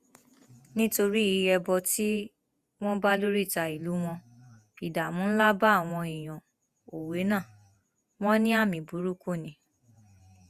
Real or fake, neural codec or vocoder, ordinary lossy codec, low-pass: fake; vocoder, 48 kHz, 128 mel bands, Vocos; Opus, 64 kbps; 14.4 kHz